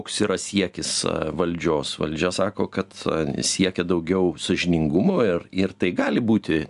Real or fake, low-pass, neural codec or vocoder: real; 10.8 kHz; none